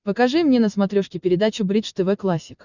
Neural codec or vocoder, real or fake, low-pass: none; real; 7.2 kHz